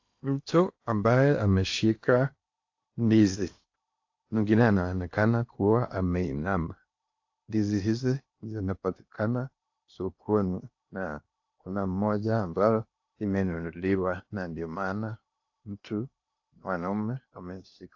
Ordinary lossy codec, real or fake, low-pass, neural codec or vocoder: AAC, 48 kbps; fake; 7.2 kHz; codec, 16 kHz in and 24 kHz out, 0.8 kbps, FocalCodec, streaming, 65536 codes